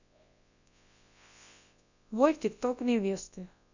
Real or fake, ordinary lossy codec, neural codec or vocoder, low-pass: fake; none; codec, 24 kHz, 0.9 kbps, WavTokenizer, large speech release; 7.2 kHz